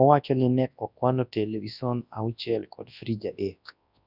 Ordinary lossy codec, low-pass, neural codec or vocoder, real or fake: none; 5.4 kHz; codec, 24 kHz, 0.9 kbps, WavTokenizer, large speech release; fake